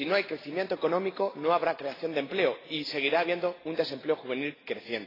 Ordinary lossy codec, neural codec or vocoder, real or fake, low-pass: AAC, 24 kbps; none; real; 5.4 kHz